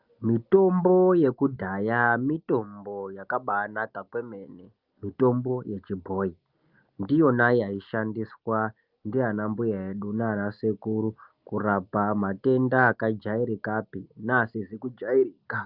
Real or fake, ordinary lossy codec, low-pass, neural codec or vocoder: real; Opus, 24 kbps; 5.4 kHz; none